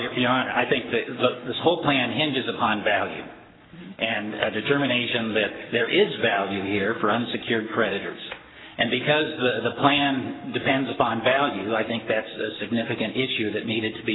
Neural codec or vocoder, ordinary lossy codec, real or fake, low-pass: codec, 44.1 kHz, 7.8 kbps, Pupu-Codec; AAC, 16 kbps; fake; 7.2 kHz